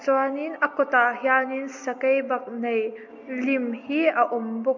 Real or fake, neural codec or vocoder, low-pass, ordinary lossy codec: real; none; 7.2 kHz; MP3, 48 kbps